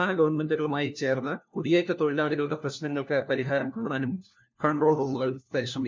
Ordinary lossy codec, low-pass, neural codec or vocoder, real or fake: MP3, 64 kbps; 7.2 kHz; codec, 16 kHz, 1 kbps, FunCodec, trained on LibriTTS, 50 frames a second; fake